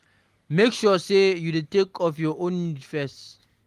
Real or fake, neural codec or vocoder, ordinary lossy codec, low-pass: real; none; Opus, 24 kbps; 14.4 kHz